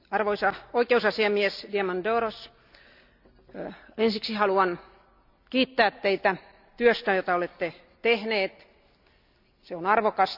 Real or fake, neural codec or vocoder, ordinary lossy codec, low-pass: real; none; none; 5.4 kHz